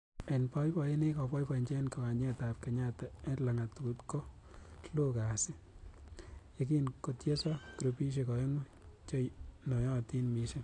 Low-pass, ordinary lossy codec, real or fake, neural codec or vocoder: 10.8 kHz; AAC, 48 kbps; real; none